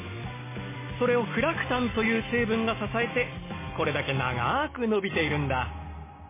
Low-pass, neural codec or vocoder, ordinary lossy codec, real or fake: 3.6 kHz; none; MP3, 16 kbps; real